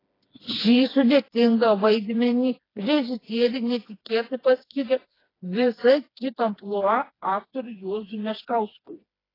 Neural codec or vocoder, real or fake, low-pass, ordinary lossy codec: codec, 16 kHz, 2 kbps, FreqCodec, smaller model; fake; 5.4 kHz; AAC, 24 kbps